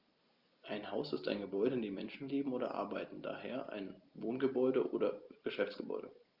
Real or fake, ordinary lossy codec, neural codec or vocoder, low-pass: real; Opus, 32 kbps; none; 5.4 kHz